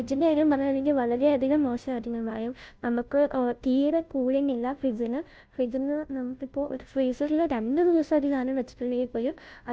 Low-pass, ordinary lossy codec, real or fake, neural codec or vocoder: none; none; fake; codec, 16 kHz, 0.5 kbps, FunCodec, trained on Chinese and English, 25 frames a second